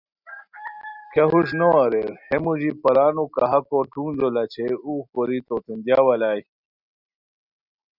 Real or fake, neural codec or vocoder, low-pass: real; none; 5.4 kHz